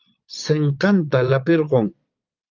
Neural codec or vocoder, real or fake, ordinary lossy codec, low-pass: vocoder, 22.05 kHz, 80 mel bands, Vocos; fake; Opus, 24 kbps; 7.2 kHz